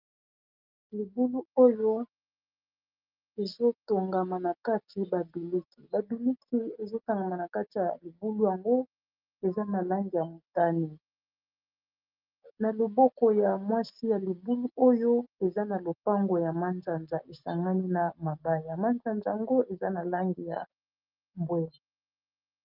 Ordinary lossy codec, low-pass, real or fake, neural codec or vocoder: Opus, 24 kbps; 5.4 kHz; real; none